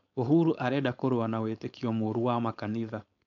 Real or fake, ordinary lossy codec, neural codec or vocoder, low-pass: fake; MP3, 96 kbps; codec, 16 kHz, 4.8 kbps, FACodec; 7.2 kHz